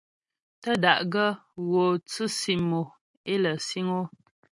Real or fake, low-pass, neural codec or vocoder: real; 10.8 kHz; none